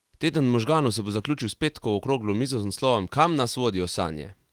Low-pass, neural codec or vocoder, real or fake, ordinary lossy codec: 19.8 kHz; autoencoder, 48 kHz, 128 numbers a frame, DAC-VAE, trained on Japanese speech; fake; Opus, 24 kbps